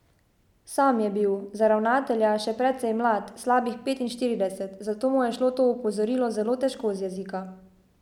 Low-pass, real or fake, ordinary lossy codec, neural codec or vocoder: 19.8 kHz; real; none; none